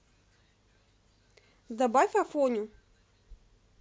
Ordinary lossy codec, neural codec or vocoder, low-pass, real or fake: none; none; none; real